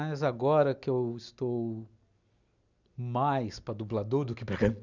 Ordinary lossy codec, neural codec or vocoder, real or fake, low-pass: none; none; real; 7.2 kHz